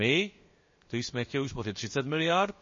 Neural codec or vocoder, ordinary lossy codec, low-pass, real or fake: codec, 16 kHz, about 1 kbps, DyCAST, with the encoder's durations; MP3, 32 kbps; 7.2 kHz; fake